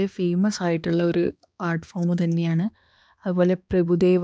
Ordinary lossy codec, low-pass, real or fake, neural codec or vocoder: none; none; fake; codec, 16 kHz, 2 kbps, X-Codec, HuBERT features, trained on balanced general audio